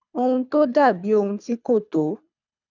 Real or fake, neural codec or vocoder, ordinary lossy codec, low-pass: fake; codec, 24 kHz, 3 kbps, HILCodec; none; 7.2 kHz